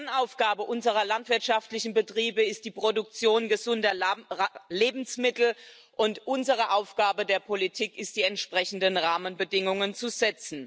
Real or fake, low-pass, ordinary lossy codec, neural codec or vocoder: real; none; none; none